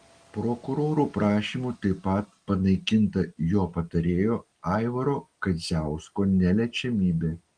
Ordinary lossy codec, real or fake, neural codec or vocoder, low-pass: Opus, 24 kbps; real; none; 9.9 kHz